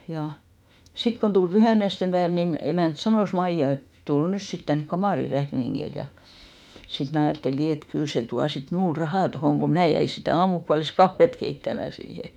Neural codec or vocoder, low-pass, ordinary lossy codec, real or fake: autoencoder, 48 kHz, 32 numbers a frame, DAC-VAE, trained on Japanese speech; 19.8 kHz; none; fake